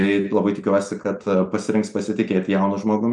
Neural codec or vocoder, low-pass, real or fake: none; 10.8 kHz; real